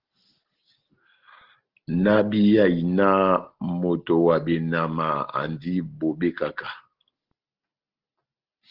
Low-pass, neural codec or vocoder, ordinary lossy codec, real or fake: 5.4 kHz; none; Opus, 16 kbps; real